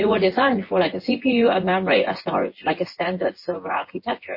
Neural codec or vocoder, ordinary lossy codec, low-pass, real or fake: vocoder, 24 kHz, 100 mel bands, Vocos; MP3, 24 kbps; 5.4 kHz; fake